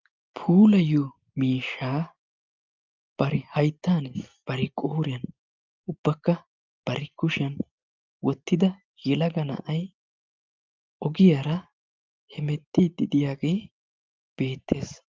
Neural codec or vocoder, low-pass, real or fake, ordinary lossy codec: none; 7.2 kHz; real; Opus, 24 kbps